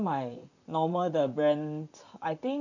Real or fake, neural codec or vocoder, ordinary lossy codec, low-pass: fake; vocoder, 44.1 kHz, 128 mel bands, Pupu-Vocoder; none; 7.2 kHz